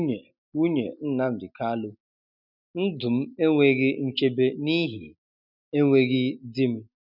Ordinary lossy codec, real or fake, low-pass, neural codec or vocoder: none; real; 5.4 kHz; none